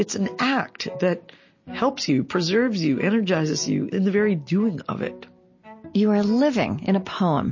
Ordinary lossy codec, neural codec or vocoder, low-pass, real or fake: MP3, 32 kbps; none; 7.2 kHz; real